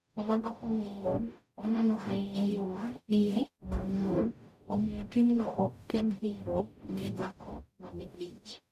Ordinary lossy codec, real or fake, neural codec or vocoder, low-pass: none; fake; codec, 44.1 kHz, 0.9 kbps, DAC; 14.4 kHz